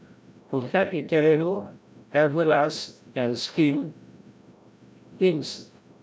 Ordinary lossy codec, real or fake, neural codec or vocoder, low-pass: none; fake; codec, 16 kHz, 0.5 kbps, FreqCodec, larger model; none